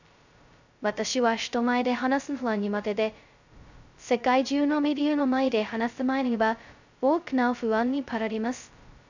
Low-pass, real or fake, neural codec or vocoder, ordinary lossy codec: 7.2 kHz; fake; codec, 16 kHz, 0.2 kbps, FocalCodec; none